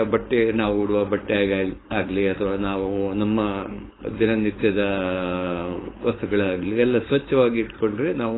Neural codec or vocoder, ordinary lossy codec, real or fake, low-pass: codec, 16 kHz, 4.8 kbps, FACodec; AAC, 16 kbps; fake; 7.2 kHz